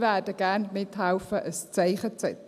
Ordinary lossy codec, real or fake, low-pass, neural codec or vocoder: none; real; 14.4 kHz; none